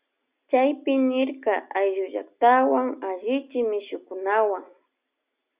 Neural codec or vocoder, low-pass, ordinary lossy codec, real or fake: none; 3.6 kHz; Opus, 64 kbps; real